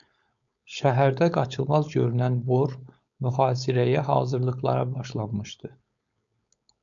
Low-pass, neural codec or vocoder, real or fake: 7.2 kHz; codec, 16 kHz, 4.8 kbps, FACodec; fake